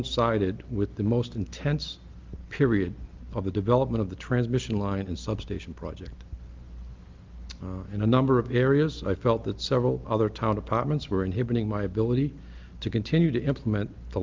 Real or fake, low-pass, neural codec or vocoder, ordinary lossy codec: real; 7.2 kHz; none; Opus, 32 kbps